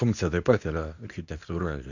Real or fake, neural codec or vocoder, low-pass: fake; codec, 24 kHz, 0.9 kbps, WavTokenizer, medium speech release version 2; 7.2 kHz